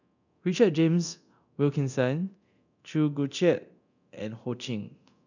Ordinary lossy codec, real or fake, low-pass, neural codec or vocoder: none; fake; 7.2 kHz; codec, 24 kHz, 0.9 kbps, DualCodec